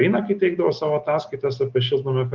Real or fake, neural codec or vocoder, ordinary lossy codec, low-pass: real; none; Opus, 32 kbps; 7.2 kHz